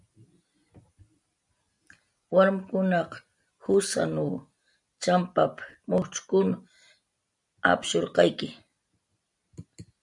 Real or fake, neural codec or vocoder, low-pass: real; none; 10.8 kHz